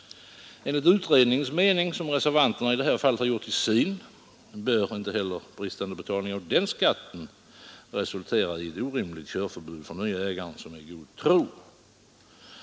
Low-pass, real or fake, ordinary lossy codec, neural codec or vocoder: none; real; none; none